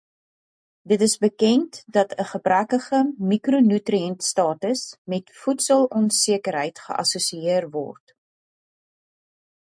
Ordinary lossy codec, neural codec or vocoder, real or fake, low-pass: MP3, 64 kbps; none; real; 9.9 kHz